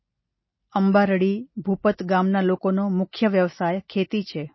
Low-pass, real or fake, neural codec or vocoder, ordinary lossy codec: 7.2 kHz; real; none; MP3, 24 kbps